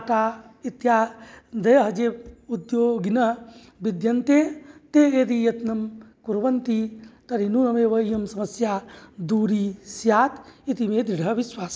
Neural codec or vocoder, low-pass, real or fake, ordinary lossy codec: none; none; real; none